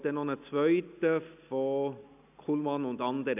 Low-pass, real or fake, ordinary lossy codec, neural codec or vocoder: 3.6 kHz; real; none; none